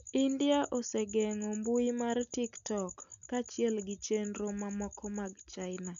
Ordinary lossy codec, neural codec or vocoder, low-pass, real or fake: none; none; 7.2 kHz; real